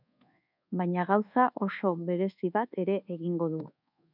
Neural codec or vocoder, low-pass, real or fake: codec, 24 kHz, 1.2 kbps, DualCodec; 5.4 kHz; fake